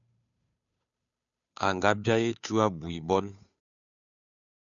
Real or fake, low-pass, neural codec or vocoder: fake; 7.2 kHz; codec, 16 kHz, 2 kbps, FunCodec, trained on Chinese and English, 25 frames a second